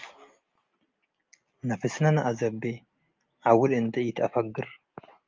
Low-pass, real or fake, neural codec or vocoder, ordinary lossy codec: 7.2 kHz; real; none; Opus, 24 kbps